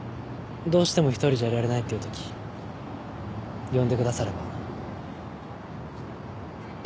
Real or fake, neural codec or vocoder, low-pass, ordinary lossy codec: real; none; none; none